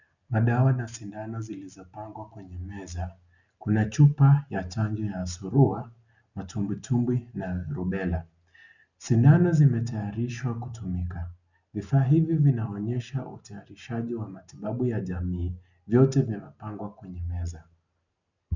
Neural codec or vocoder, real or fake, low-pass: none; real; 7.2 kHz